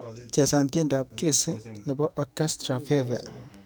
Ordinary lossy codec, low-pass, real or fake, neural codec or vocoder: none; none; fake; codec, 44.1 kHz, 2.6 kbps, SNAC